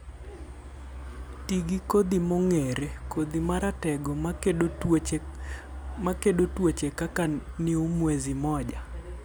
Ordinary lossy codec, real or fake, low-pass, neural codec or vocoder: none; real; none; none